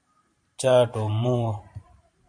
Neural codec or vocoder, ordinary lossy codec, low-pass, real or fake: vocoder, 44.1 kHz, 128 mel bands every 512 samples, BigVGAN v2; MP3, 48 kbps; 9.9 kHz; fake